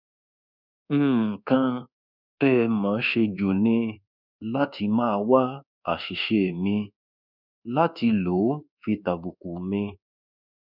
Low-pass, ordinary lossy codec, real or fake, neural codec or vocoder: 5.4 kHz; none; fake; codec, 24 kHz, 1.2 kbps, DualCodec